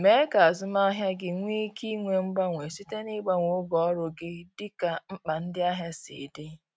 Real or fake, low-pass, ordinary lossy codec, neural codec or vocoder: real; none; none; none